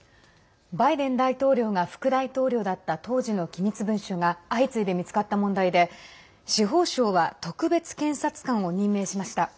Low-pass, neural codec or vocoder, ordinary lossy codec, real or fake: none; none; none; real